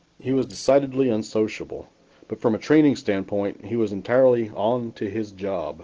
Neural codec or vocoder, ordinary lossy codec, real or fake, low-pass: none; Opus, 16 kbps; real; 7.2 kHz